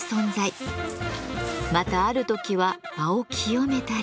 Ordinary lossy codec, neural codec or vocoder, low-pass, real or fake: none; none; none; real